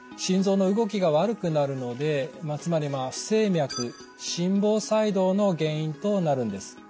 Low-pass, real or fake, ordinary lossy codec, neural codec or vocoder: none; real; none; none